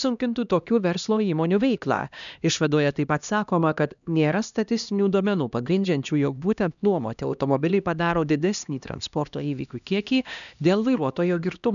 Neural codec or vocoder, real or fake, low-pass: codec, 16 kHz, 1 kbps, X-Codec, HuBERT features, trained on LibriSpeech; fake; 7.2 kHz